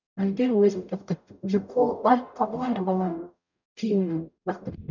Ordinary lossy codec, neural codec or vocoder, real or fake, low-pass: none; codec, 44.1 kHz, 0.9 kbps, DAC; fake; 7.2 kHz